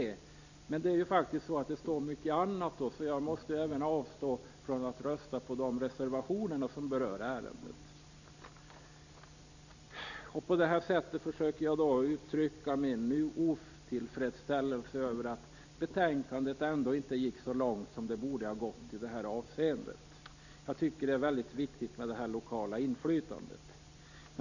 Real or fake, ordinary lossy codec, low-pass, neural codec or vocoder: real; none; 7.2 kHz; none